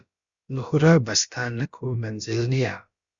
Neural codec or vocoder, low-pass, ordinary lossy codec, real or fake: codec, 16 kHz, about 1 kbps, DyCAST, with the encoder's durations; 7.2 kHz; Opus, 64 kbps; fake